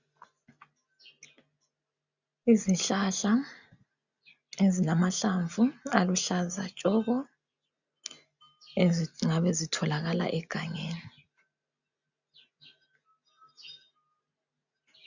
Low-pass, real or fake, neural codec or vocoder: 7.2 kHz; real; none